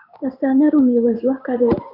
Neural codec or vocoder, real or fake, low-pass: codec, 16 kHz in and 24 kHz out, 1 kbps, XY-Tokenizer; fake; 5.4 kHz